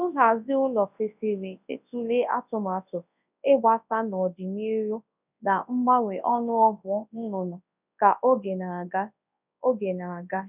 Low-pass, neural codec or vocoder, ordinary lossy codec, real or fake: 3.6 kHz; codec, 24 kHz, 0.9 kbps, WavTokenizer, large speech release; none; fake